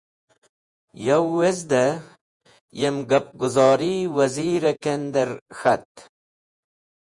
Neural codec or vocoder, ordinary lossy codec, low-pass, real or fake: vocoder, 48 kHz, 128 mel bands, Vocos; MP3, 96 kbps; 10.8 kHz; fake